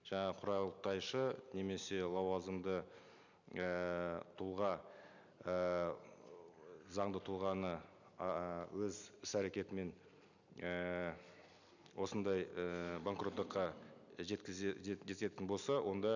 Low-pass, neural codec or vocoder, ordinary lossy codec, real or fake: 7.2 kHz; none; none; real